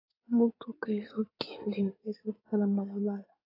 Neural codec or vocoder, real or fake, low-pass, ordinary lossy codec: codec, 16 kHz in and 24 kHz out, 2.2 kbps, FireRedTTS-2 codec; fake; 5.4 kHz; AAC, 24 kbps